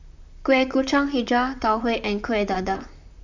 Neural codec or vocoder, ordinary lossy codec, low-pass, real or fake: vocoder, 44.1 kHz, 128 mel bands, Pupu-Vocoder; none; 7.2 kHz; fake